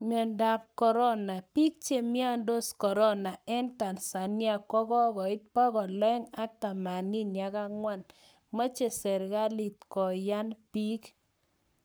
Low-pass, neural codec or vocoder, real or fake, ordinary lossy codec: none; codec, 44.1 kHz, 7.8 kbps, Pupu-Codec; fake; none